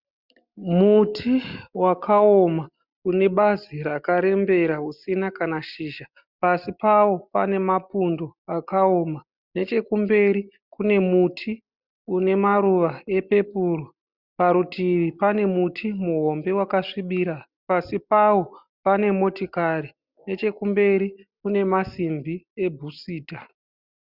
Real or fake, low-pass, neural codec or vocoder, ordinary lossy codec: real; 5.4 kHz; none; Opus, 64 kbps